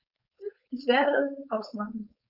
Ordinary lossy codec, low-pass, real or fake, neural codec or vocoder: none; 5.4 kHz; fake; codec, 16 kHz, 4.8 kbps, FACodec